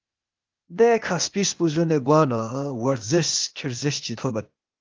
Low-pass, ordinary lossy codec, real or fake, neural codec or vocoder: 7.2 kHz; Opus, 32 kbps; fake; codec, 16 kHz, 0.8 kbps, ZipCodec